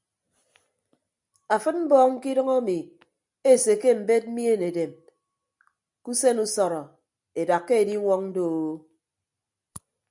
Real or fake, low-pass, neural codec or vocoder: real; 10.8 kHz; none